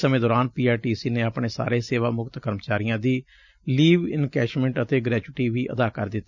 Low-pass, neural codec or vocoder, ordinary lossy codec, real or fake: 7.2 kHz; none; none; real